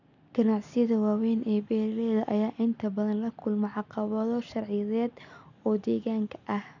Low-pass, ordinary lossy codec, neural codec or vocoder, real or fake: 7.2 kHz; none; none; real